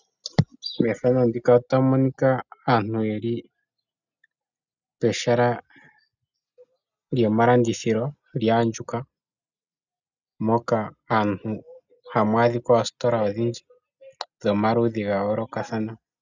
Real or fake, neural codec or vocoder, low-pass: real; none; 7.2 kHz